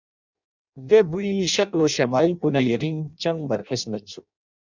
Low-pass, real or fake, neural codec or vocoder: 7.2 kHz; fake; codec, 16 kHz in and 24 kHz out, 0.6 kbps, FireRedTTS-2 codec